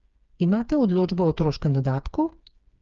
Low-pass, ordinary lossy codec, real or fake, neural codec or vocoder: 7.2 kHz; Opus, 24 kbps; fake; codec, 16 kHz, 4 kbps, FreqCodec, smaller model